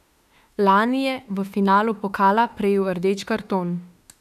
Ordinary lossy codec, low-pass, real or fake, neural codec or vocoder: none; 14.4 kHz; fake; autoencoder, 48 kHz, 32 numbers a frame, DAC-VAE, trained on Japanese speech